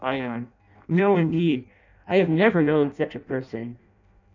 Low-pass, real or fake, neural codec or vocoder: 7.2 kHz; fake; codec, 16 kHz in and 24 kHz out, 0.6 kbps, FireRedTTS-2 codec